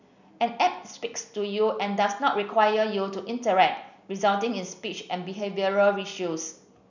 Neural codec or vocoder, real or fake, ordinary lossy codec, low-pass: none; real; none; 7.2 kHz